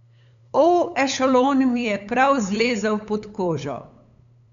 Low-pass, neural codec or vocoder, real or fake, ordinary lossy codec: 7.2 kHz; codec, 16 kHz, 8 kbps, FunCodec, trained on LibriTTS, 25 frames a second; fake; none